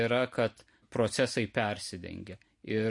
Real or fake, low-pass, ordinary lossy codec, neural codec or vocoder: real; 10.8 kHz; MP3, 48 kbps; none